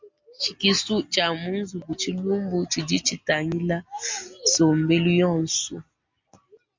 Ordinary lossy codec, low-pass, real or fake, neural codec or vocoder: MP3, 48 kbps; 7.2 kHz; real; none